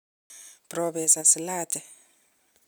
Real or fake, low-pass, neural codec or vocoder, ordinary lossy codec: real; none; none; none